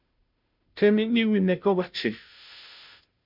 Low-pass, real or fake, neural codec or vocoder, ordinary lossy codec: 5.4 kHz; fake; codec, 16 kHz, 0.5 kbps, FunCodec, trained on Chinese and English, 25 frames a second; MP3, 48 kbps